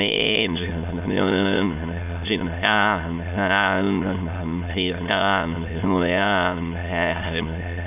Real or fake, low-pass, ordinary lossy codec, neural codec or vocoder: fake; 3.6 kHz; none; autoencoder, 22.05 kHz, a latent of 192 numbers a frame, VITS, trained on many speakers